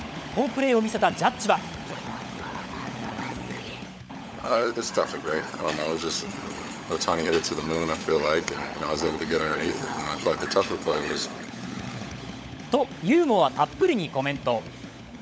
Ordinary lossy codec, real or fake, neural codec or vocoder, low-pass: none; fake; codec, 16 kHz, 16 kbps, FunCodec, trained on LibriTTS, 50 frames a second; none